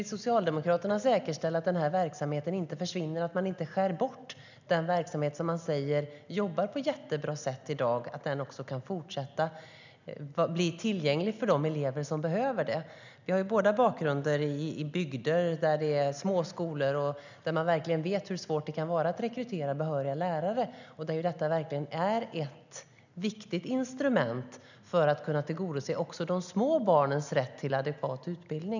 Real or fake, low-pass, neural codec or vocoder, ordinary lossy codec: real; 7.2 kHz; none; none